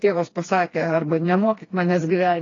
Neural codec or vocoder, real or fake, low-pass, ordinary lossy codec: codec, 16 kHz, 2 kbps, FreqCodec, smaller model; fake; 7.2 kHz; AAC, 32 kbps